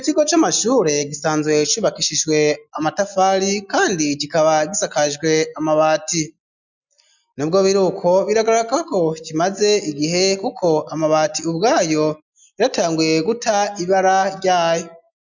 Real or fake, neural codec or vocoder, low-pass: real; none; 7.2 kHz